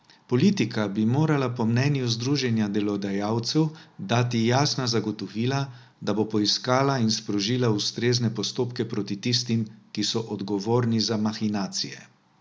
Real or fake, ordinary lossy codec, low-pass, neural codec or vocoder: real; none; none; none